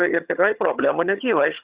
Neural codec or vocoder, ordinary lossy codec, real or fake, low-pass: vocoder, 22.05 kHz, 80 mel bands, HiFi-GAN; Opus, 64 kbps; fake; 3.6 kHz